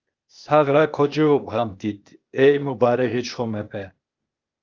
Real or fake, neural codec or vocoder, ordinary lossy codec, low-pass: fake; codec, 16 kHz, 0.8 kbps, ZipCodec; Opus, 24 kbps; 7.2 kHz